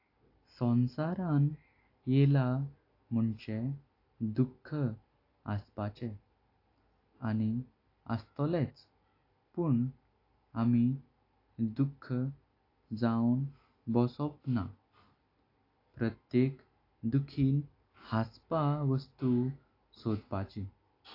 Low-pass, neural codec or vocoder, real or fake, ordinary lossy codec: 5.4 kHz; none; real; AAC, 32 kbps